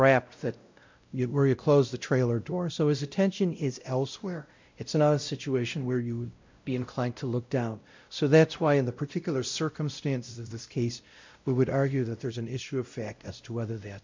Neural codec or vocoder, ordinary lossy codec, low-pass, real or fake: codec, 16 kHz, 0.5 kbps, X-Codec, WavLM features, trained on Multilingual LibriSpeech; MP3, 64 kbps; 7.2 kHz; fake